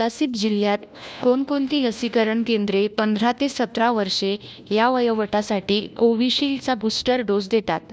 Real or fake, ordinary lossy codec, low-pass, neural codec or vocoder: fake; none; none; codec, 16 kHz, 1 kbps, FunCodec, trained on LibriTTS, 50 frames a second